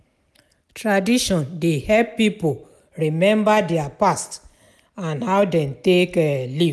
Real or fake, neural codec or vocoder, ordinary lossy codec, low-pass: real; none; none; none